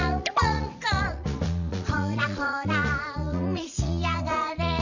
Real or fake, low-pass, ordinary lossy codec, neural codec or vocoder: fake; 7.2 kHz; none; vocoder, 44.1 kHz, 80 mel bands, Vocos